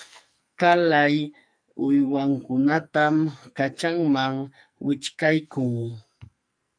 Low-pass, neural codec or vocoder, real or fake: 9.9 kHz; codec, 44.1 kHz, 2.6 kbps, SNAC; fake